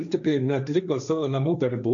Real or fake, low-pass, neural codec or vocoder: fake; 7.2 kHz; codec, 16 kHz, 1.1 kbps, Voila-Tokenizer